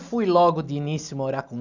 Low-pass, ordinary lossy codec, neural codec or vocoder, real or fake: 7.2 kHz; none; none; real